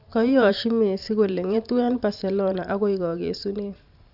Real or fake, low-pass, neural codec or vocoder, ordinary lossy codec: fake; 5.4 kHz; vocoder, 44.1 kHz, 128 mel bands every 512 samples, BigVGAN v2; none